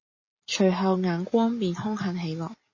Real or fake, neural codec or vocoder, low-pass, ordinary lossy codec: real; none; 7.2 kHz; MP3, 32 kbps